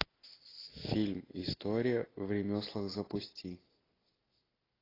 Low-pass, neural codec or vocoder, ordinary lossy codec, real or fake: 5.4 kHz; none; AAC, 24 kbps; real